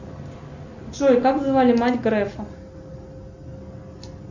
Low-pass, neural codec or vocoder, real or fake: 7.2 kHz; none; real